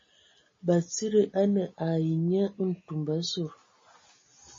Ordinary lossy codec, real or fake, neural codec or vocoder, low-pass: MP3, 32 kbps; real; none; 7.2 kHz